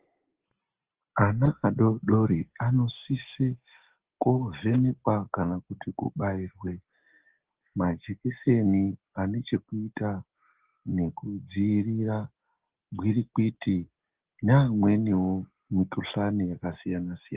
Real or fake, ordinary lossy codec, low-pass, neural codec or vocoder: real; Opus, 16 kbps; 3.6 kHz; none